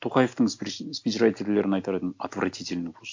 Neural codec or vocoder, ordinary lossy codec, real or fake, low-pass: none; MP3, 48 kbps; real; 7.2 kHz